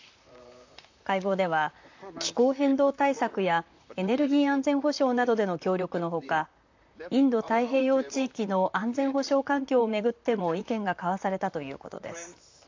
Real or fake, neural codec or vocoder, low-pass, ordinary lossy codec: fake; vocoder, 44.1 kHz, 128 mel bands, Pupu-Vocoder; 7.2 kHz; AAC, 48 kbps